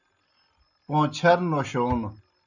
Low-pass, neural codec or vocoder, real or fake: 7.2 kHz; none; real